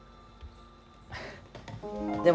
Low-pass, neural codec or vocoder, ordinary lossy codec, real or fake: none; none; none; real